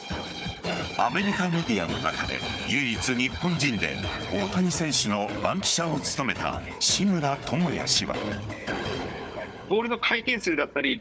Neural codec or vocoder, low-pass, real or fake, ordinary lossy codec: codec, 16 kHz, 4 kbps, FunCodec, trained on Chinese and English, 50 frames a second; none; fake; none